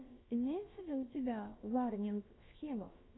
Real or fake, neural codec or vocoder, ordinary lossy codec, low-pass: fake; codec, 16 kHz, about 1 kbps, DyCAST, with the encoder's durations; AAC, 16 kbps; 7.2 kHz